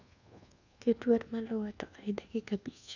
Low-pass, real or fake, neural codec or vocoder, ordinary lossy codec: 7.2 kHz; fake; codec, 24 kHz, 1.2 kbps, DualCodec; none